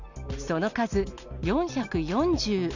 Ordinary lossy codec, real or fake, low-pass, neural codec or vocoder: none; real; 7.2 kHz; none